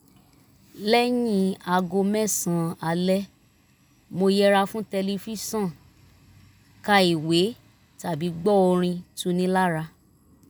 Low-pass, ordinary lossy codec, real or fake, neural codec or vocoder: none; none; real; none